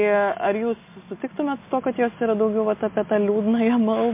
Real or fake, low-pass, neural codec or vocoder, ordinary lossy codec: real; 3.6 kHz; none; MP3, 24 kbps